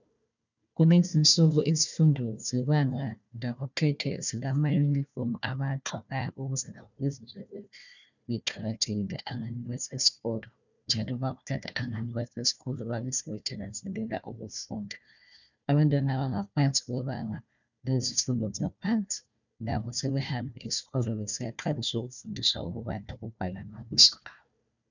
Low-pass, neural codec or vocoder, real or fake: 7.2 kHz; codec, 16 kHz, 1 kbps, FunCodec, trained on Chinese and English, 50 frames a second; fake